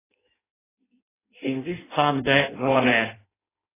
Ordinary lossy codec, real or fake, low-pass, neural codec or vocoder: AAC, 16 kbps; fake; 3.6 kHz; codec, 16 kHz in and 24 kHz out, 0.6 kbps, FireRedTTS-2 codec